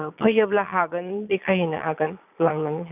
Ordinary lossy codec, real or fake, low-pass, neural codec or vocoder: none; fake; 3.6 kHz; vocoder, 22.05 kHz, 80 mel bands, Vocos